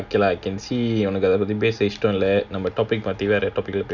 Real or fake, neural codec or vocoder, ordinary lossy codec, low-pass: real; none; none; 7.2 kHz